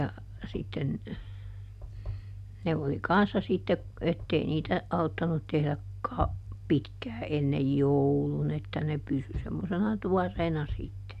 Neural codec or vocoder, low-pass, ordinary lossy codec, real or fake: none; 14.4 kHz; none; real